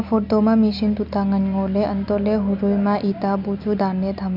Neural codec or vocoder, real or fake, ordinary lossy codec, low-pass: none; real; none; 5.4 kHz